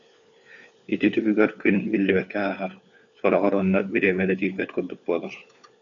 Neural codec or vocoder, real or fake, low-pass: codec, 16 kHz, 4 kbps, FunCodec, trained on LibriTTS, 50 frames a second; fake; 7.2 kHz